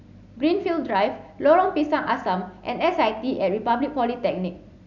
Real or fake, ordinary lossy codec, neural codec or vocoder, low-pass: real; none; none; 7.2 kHz